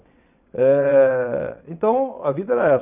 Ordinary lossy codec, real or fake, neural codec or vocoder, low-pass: none; fake; vocoder, 22.05 kHz, 80 mel bands, WaveNeXt; 3.6 kHz